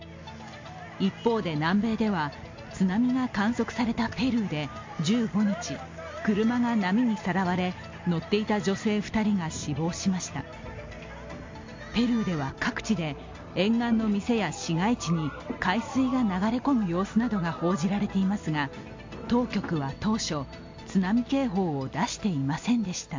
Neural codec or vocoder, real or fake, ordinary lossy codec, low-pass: none; real; MP3, 48 kbps; 7.2 kHz